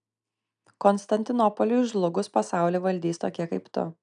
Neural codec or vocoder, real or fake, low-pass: none; real; 9.9 kHz